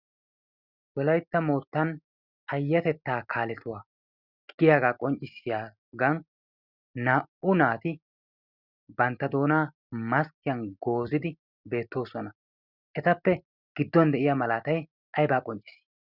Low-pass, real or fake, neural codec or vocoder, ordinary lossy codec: 5.4 kHz; real; none; Opus, 64 kbps